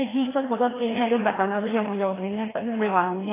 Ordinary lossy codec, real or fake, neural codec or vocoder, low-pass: AAC, 16 kbps; fake; codec, 16 kHz, 1 kbps, FreqCodec, larger model; 3.6 kHz